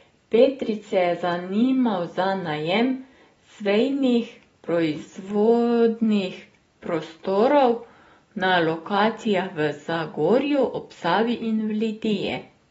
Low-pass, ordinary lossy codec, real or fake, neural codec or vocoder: 19.8 kHz; AAC, 24 kbps; real; none